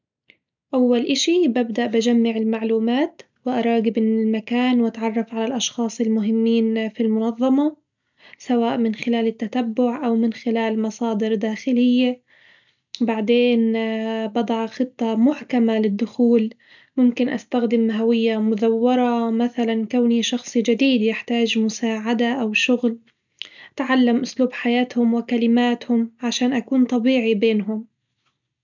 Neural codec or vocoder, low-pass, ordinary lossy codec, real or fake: none; 7.2 kHz; none; real